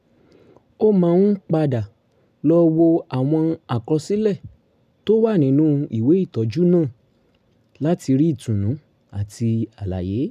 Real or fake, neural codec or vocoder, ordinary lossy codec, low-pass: real; none; none; 14.4 kHz